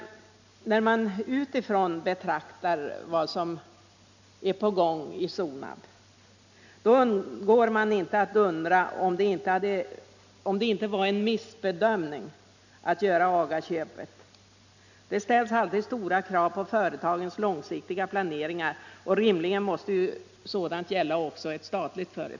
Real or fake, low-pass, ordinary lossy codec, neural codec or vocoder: real; 7.2 kHz; none; none